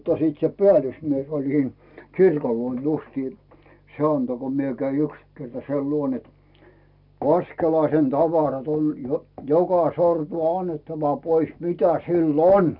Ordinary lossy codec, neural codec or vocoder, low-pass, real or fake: none; none; 5.4 kHz; real